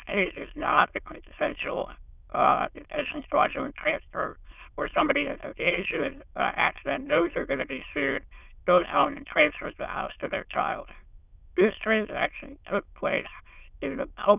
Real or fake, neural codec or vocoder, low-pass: fake; autoencoder, 22.05 kHz, a latent of 192 numbers a frame, VITS, trained on many speakers; 3.6 kHz